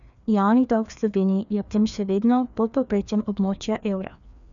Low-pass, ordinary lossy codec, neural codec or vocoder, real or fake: 7.2 kHz; none; codec, 16 kHz, 2 kbps, FreqCodec, larger model; fake